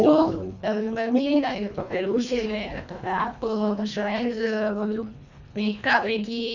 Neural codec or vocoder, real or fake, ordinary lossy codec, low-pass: codec, 24 kHz, 1.5 kbps, HILCodec; fake; none; 7.2 kHz